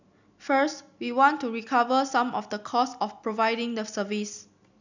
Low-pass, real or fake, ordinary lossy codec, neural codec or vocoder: 7.2 kHz; real; none; none